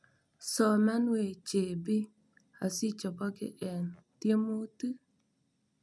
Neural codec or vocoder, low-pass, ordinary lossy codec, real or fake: none; none; none; real